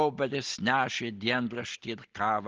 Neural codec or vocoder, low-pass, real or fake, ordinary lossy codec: none; 7.2 kHz; real; Opus, 16 kbps